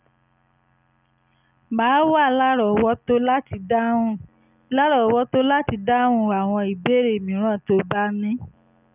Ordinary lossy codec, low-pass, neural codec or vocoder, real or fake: none; 3.6 kHz; none; real